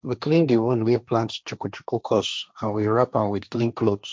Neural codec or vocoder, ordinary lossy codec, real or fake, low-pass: codec, 16 kHz, 1.1 kbps, Voila-Tokenizer; none; fake; none